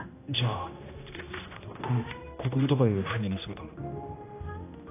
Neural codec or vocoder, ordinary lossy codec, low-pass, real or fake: codec, 16 kHz, 1 kbps, X-Codec, HuBERT features, trained on general audio; AAC, 24 kbps; 3.6 kHz; fake